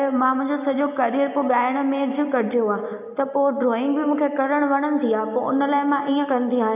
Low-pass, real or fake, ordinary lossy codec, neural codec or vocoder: 3.6 kHz; real; AAC, 32 kbps; none